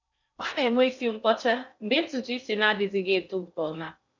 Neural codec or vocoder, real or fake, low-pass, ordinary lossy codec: codec, 16 kHz in and 24 kHz out, 0.6 kbps, FocalCodec, streaming, 2048 codes; fake; 7.2 kHz; none